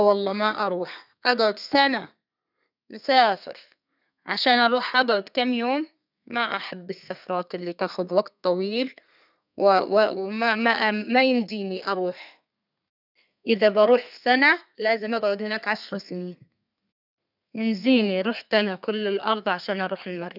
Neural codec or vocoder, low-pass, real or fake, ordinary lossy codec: codec, 32 kHz, 1.9 kbps, SNAC; 5.4 kHz; fake; none